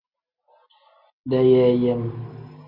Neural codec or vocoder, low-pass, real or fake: none; 5.4 kHz; real